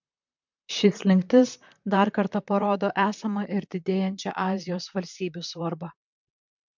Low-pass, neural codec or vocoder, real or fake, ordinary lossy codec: 7.2 kHz; vocoder, 44.1 kHz, 128 mel bands, Pupu-Vocoder; fake; MP3, 64 kbps